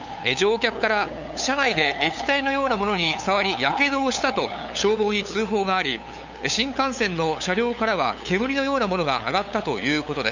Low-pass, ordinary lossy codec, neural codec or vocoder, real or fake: 7.2 kHz; none; codec, 16 kHz, 8 kbps, FunCodec, trained on LibriTTS, 25 frames a second; fake